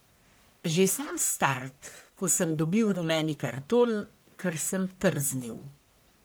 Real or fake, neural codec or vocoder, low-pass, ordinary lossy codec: fake; codec, 44.1 kHz, 1.7 kbps, Pupu-Codec; none; none